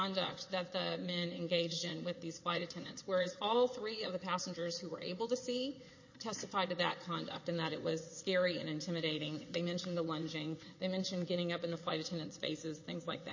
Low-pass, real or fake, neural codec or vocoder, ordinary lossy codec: 7.2 kHz; fake; vocoder, 22.05 kHz, 80 mel bands, WaveNeXt; MP3, 32 kbps